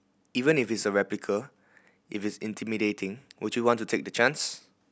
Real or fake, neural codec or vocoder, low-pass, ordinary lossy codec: real; none; none; none